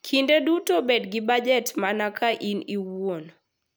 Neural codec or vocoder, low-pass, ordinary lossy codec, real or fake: none; none; none; real